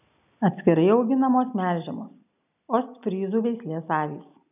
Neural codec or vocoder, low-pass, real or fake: none; 3.6 kHz; real